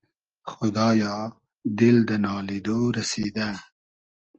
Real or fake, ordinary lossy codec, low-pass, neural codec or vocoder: real; Opus, 24 kbps; 7.2 kHz; none